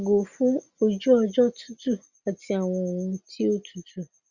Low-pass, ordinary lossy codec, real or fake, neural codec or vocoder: 7.2 kHz; Opus, 64 kbps; real; none